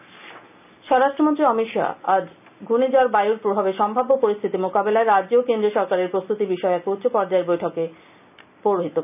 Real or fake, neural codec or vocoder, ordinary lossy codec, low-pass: real; none; none; 3.6 kHz